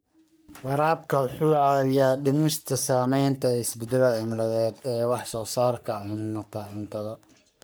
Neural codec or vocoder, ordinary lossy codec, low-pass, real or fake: codec, 44.1 kHz, 3.4 kbps, Pupu-Codec; none; none; fake